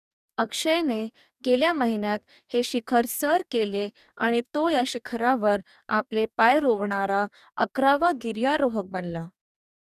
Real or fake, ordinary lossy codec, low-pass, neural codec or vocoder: fake; none; 14.4 kHz; codec, 44.1 kHz, 2.6 kbps, DAC